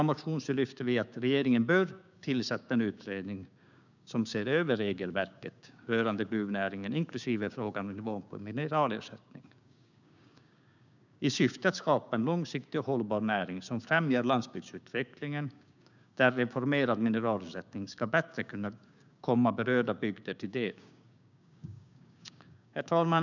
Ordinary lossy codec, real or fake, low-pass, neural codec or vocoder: none; fake; 7.2 kHz; codec, 16 kHz, 6 kbps, DAC